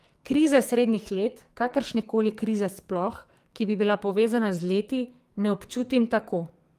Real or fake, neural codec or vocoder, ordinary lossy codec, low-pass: fake; codec, 44.1 kHz, 2.6 kbps, SNAC; Opus, 32 kbps; 14.4 kHz